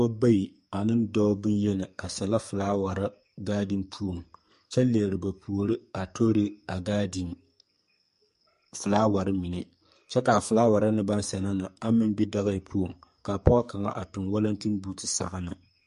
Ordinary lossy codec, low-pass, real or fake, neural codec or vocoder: MP3, 48 kbps; 14.4 kHz; fake; codec, 44.1 kHz, 2.6 kbps, SNAC